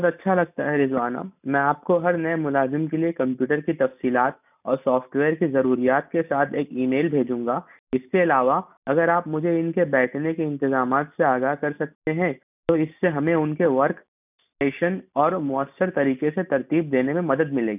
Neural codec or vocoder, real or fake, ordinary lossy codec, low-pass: none; real; none; 3.6 kHz